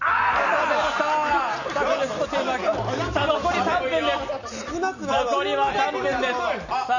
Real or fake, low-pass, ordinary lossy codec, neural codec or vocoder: real; 7.2 kHz; none; none